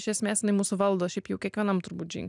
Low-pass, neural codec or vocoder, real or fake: 10.8 kHz; none; real